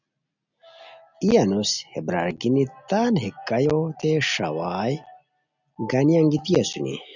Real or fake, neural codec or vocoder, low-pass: real; none; 7.2 kHz